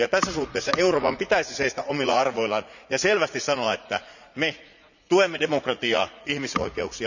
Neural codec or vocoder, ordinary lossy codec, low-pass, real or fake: vocoder, 44.1 kHz, 80 mel bands, Vocos; none; 7.2 kHz; fake